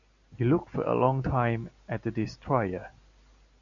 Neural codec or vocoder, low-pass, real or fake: none; 7.2 kHz; real